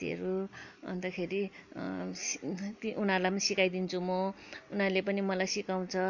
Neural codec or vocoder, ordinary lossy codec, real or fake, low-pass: none; none; real; 7.2 kHz